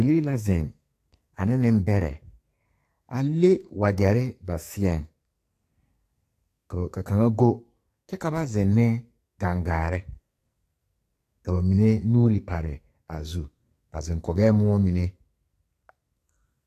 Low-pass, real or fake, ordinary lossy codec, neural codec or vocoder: 14.4 kHz; fake; AAC, 64 kbps; codec, 44.1 kHz, 2.6 kbps, SNAC